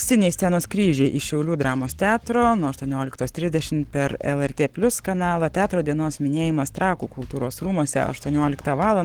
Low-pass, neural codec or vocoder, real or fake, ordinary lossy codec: 19.8 kHz; codec, 44.1 kHz, 7.8 kbps, Pupu-Codec; fake; Opus, 16 kbps